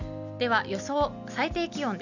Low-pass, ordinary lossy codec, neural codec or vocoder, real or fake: 7.2 kHz; none; none; real